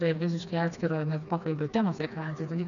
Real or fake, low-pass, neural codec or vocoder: fake; 7.2 kHz; codec, 16 kHz, 2 kbps, FreqCodec, smaller model